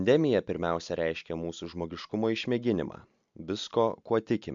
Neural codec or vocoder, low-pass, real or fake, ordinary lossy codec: none; 7.2 kHz; real; MP3, 64 kbps